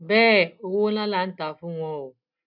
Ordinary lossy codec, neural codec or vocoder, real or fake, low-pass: none; none; real; 5.4 kHz